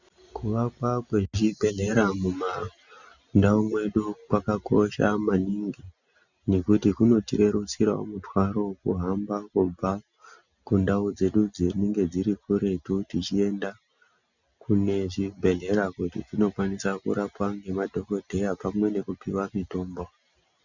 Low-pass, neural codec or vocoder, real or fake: 7.2 kHz; none; real